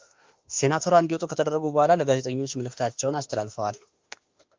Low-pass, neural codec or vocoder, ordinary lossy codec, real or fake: 7.2 kHz; autoencoder, 48 kHz, 32 numbers a frame, DAC-VAE, trained on Japanese speech; Opus, 24 kbps; fake